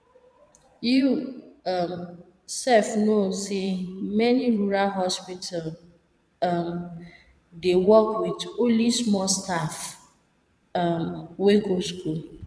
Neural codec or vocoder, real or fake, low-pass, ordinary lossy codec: vocoder, 22.05 kHz, 80 mel bands, Vocos; fake; none; none